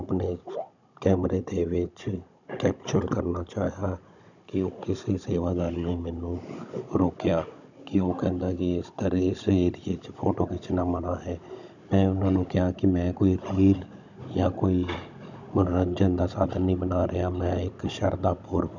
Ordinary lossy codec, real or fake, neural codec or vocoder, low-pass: none; fake; codec, 16 kHz, 16 kbps, FunCodec, trained on Chinese and English, 50 frames a second; 7.2 kHz